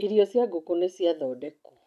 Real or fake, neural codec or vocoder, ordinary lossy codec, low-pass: real; none; none; 14.4 kHz